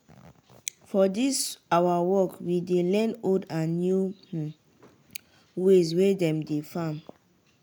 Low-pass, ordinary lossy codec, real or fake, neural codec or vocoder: none; none; real; none